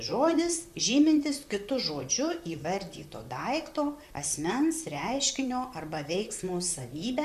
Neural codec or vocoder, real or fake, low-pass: vocoder, 44.1 kHz, 128 mel bands, Pupu-Vocoder; fake; 14.4 kHz